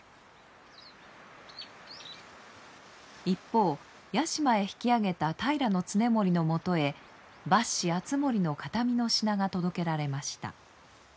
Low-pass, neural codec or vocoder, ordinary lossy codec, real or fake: none; none; none; real